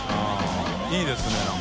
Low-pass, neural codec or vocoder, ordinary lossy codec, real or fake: none; none; none; real